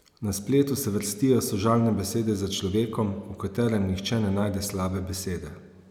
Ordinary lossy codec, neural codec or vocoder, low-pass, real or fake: none; none; 19.8 kHz; real